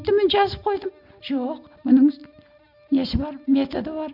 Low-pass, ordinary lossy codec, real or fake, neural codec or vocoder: 5.4 kHz; none; real; none